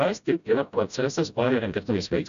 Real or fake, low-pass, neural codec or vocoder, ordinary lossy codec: fake; 7.2 kHz; codec, 16 kHz, 0.5 kbps, FreqCodec, smaller model; AAC, 96 kbps